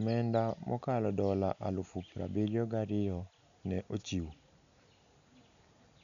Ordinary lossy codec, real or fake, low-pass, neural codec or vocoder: none; real; 7.2 kHz; none